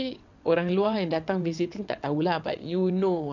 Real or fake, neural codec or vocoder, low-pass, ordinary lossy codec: fake; vocoder, 22.05 kHz, 80 mel bands, WaveNeXt; 7.2 kHz; none